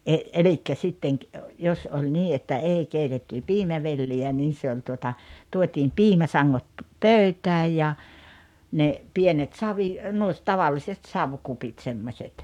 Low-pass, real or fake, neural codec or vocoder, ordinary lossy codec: 19.8 kHz; fake; codec, 44.1 kHz, 7.8 kbps, Pupu-Codec; none